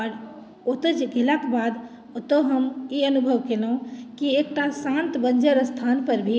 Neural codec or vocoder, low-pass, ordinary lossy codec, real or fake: none; none; none; real